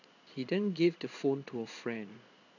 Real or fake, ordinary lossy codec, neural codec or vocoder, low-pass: fake; none; codec, 16 kHz in and 24 kHz out, 1 kbps, XY-Tokenizer; 7.2 kHz